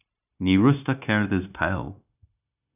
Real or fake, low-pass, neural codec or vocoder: fake; 3.6 kHz; codec, 16 kHz, 0.9 kbps, LongCat-Audio-Codec